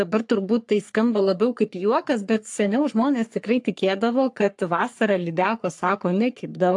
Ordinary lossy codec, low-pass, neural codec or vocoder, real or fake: AAC, 64 kbps; 10.8 kHz; codec, 44.1 kHz, 3.4 kbps, Pupu-Codec; fake